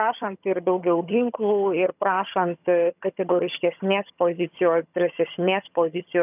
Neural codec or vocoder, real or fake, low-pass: vocoder, 22.05 kHz, 80 mel bands, HiFi-GAN; fake; 3.6 kHz